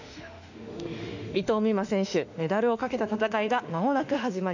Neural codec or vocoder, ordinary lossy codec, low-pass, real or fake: autoencoder, 48 kHz, 32 numbers a frame, DAC-VAE, trained on Japanese speech; none; 7.2 kHz; fake